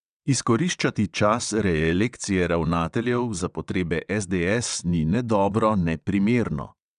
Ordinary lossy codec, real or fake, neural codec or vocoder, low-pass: none; fake; vocoder, 22.05 kHz, 80 mel bands, WaveNeXt; 9.9 kHz